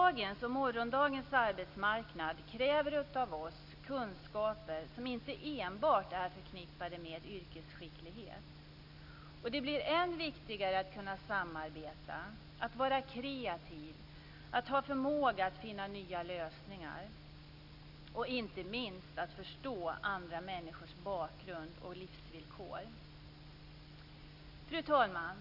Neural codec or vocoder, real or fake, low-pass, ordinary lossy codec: none; real; 5.4 kHz; none